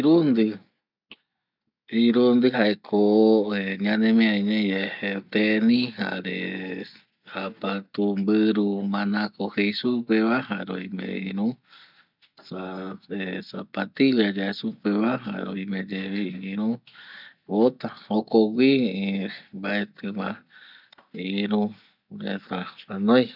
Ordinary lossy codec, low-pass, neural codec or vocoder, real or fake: none; 5.4 kHz; none; real